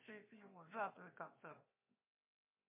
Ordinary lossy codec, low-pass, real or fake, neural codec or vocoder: MP3, 24 kbps; 3.6 kHz; fake; codec, 16 kHz, 0.7 kbps, FocalCodec